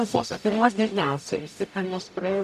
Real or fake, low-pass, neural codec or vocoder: fake; 14.4 kHz; codec, 44.1 kHz, 0.9 kbps, DAC